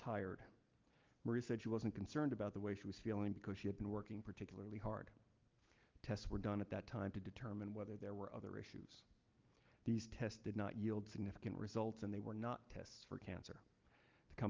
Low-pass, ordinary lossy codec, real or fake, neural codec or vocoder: 7.2 kHz; Opus, 32 kbps; real; none